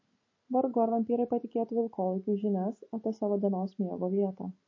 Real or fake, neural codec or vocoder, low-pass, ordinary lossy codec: real; none; 7.2 kHz; MP3, 32 kbps